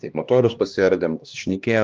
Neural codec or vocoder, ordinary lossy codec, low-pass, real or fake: codec, 16 kHz, 1 kbps, X-Codec, HuBERT features, trained on LibriSpeech; Opus, 24 kbps; 7.2 kHz; fake